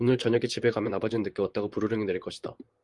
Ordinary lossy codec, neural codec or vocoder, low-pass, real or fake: Opus, 32 kbps; vocoder, 44.1 kHz, 128 mel bands, Pupu-Vocoder; 10.8 kHz; fake